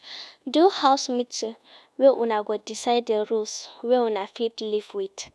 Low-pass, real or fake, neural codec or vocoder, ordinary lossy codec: none; fake; codec, 24 kHz, 1.2 kbps, DualCodec; none